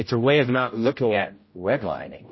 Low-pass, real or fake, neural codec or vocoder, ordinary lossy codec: 7.2 kHz; fake; codec, 16 kHz, 0.5 kbps, X-Codec, HuBERT features, trained on general audio; MP3, 24 kbps